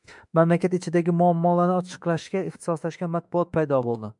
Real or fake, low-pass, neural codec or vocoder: fake; 10.8 kHz; autoencoder, 48 kHz, 32 numbers a frame, DAC-VAE, trained on Japanese speech